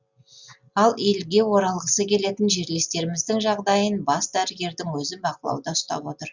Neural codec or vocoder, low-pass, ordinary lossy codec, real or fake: none; 7.2 kHz; Opus, 64 kbps; real